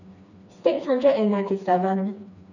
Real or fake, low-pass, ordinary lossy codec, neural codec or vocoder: fake; 7.2 kHz; none; codec, 16 kHz, 2 kbps, FreqCodec, smaller model